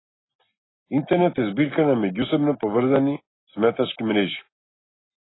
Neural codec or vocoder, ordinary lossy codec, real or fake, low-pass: none; AAC, 16 kbps; real; 7.2 kHz